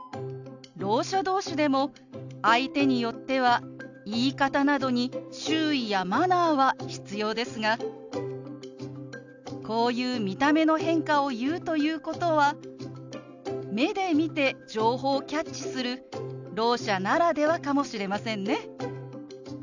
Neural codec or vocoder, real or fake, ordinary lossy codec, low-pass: none; real; none; 7.2 kHz